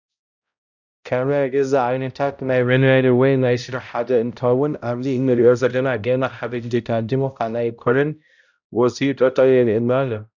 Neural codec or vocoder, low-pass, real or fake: codec, 16 kHz, 0.5 kbps, X-Codec, HuBERT features, trained on balanced general audio; 7.2 kHz; fake